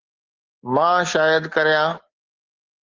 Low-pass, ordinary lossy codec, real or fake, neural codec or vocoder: 7.2 kHz; Opus, 16 kbps; real; none